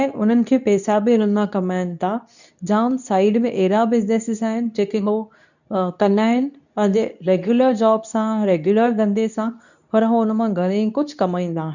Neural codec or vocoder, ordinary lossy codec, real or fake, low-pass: codec, 24 kHz, 0.9 kbps, WavTokenizer, medium speech release version 2; none; fake; 7.2 kHz